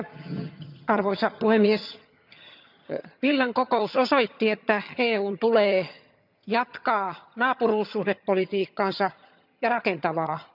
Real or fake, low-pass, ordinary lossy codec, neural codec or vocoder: fake; 5.4 kHz; none; vocoder, 22.05 kHz, 80 mel bands, HiFi-GAN